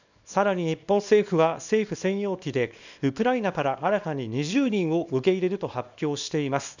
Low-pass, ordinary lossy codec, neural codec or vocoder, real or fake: 7.2 kHz; none; codec, 24 kHz, 0.9 kbps, WavTokenizer, small release; fake